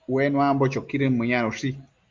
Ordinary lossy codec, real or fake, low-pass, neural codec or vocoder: Opus, 32 kbps; real; 7.2 kHz; none